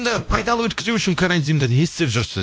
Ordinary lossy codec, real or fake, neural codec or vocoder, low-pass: none; fake; codec, 16 kHz, 1 kbps, X-Codec, WavLM features, trained on Multilingual LibriSpeech; none